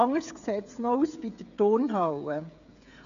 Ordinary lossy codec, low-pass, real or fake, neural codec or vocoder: none; 7.2 kHz; fake; codec, 16 kHz, 16 kbps, FreqCodec, smaller model